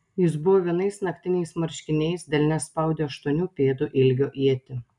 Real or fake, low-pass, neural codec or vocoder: real; 10.8 kHz; none